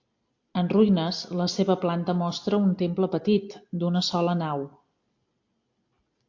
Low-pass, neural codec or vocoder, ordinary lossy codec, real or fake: 7.2 kHz; none; AAC, 48 kbps; real